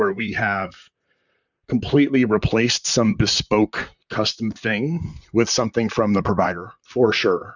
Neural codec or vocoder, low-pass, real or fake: vocoder, 44.1 kHz, 128 mel bands, Pupu-Vocoder; 7.2 kHz; fake